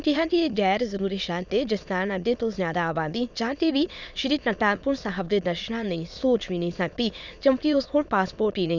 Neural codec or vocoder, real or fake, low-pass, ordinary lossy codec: autoencoder, 22.05 kHz, a latent of 192 numbers a frame, VITS, trained on many speakers; fake; 7.2 kHz; none